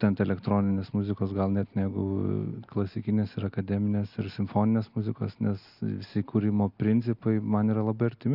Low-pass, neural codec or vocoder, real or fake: 5.4 kHz; none; real